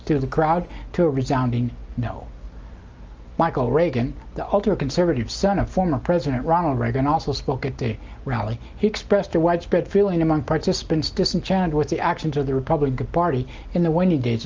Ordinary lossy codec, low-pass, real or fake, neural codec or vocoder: Opus, 24 kbps; 7.2 kHz; real; none